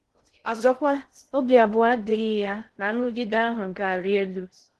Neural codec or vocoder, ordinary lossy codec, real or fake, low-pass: codec, 16 kHz in and 24 kHz out, 0.6 kbps, FocalCodec, streaming, 2048 codes; Opus, 16 kbps; fake; 10.8 kHz